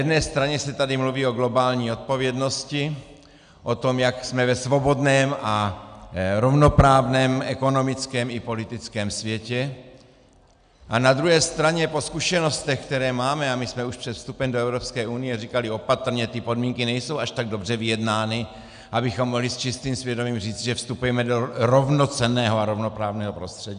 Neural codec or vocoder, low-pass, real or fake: none; 9.9 kHz; real